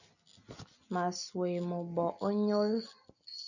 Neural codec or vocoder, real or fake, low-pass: none; real; 7.2 kHz